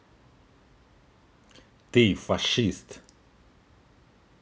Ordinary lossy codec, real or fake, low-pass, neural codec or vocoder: none; real; none; none